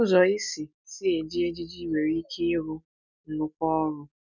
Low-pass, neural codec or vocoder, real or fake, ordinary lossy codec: 7.2 kHz; none; real; none